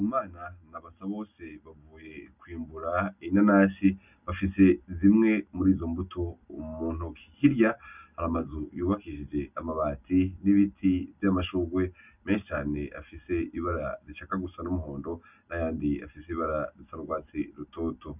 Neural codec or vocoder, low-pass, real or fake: none; 3.6 kHz; real